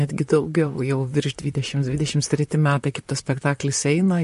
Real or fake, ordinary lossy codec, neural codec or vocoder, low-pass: fake; MP3, 48 kbps; vocoder, 44.1 kHz, 128 mel bands, Pupu-Vocoder; 14.4 kHz